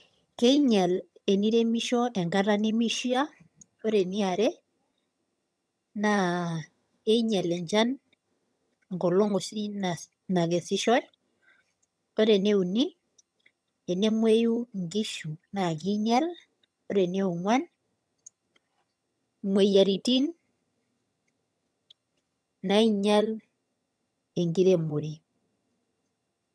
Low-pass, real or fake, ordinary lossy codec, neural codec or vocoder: none; fake; none; vocoder, 22.05 kHz, 80 mel bands, HiFi-GAN